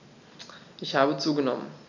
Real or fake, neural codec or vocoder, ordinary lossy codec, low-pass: real; none; none; 7.2 kHz